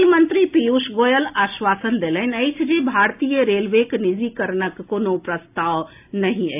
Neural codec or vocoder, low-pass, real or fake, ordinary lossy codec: none; 3.6 kHz; real; AAC, 32 kbps